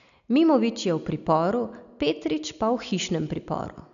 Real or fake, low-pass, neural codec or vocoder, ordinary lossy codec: real; 7.2 kHz; none; none